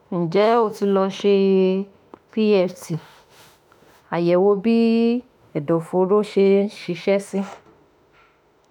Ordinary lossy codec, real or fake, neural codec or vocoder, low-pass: none; fake; autoencoder, 48 kHz, 32 numbers a frame, DAC-VAE, trained on Japanese speech; 19.8 kHz